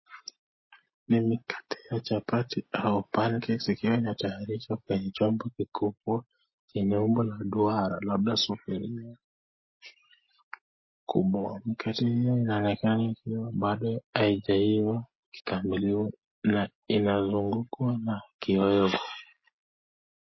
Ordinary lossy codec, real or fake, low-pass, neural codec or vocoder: MP3, 24 kbps; real; 7.2 kHz; none